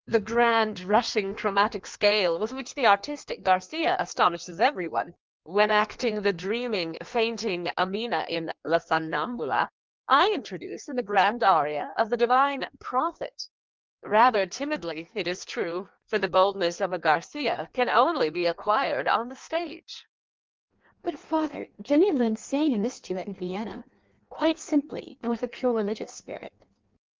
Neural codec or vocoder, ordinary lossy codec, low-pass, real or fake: codec, 16 kHz in and 24 kHz out, 1.1 kbps, FireRedTTS-2 codec; Opus, 24 kbps; 7.2 kHz; fake